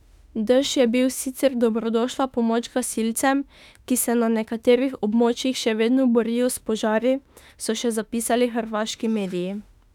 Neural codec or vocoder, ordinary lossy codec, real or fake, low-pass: autoencoder, 48 kHz, 32 numbers a frame, DAC-VAE, trained on Japanese speech; none; fake; 19.8 kHz